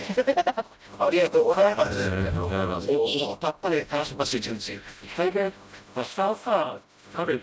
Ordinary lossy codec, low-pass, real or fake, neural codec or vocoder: none; none; fake; codec, 16 kHz, 0.5 kbps, FreqCodec, smaller model